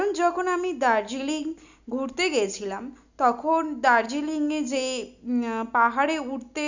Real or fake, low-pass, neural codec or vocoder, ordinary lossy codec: real; 7.2 kHz; none; none